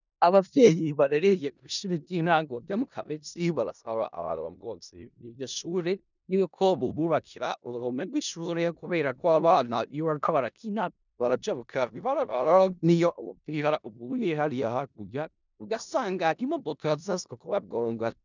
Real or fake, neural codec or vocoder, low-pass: fake; codec, 16 kHz in and 24 kHz out, 0.4 kbps, LongCat-Audio-Codec, four codebook decoder; 7.2 kHz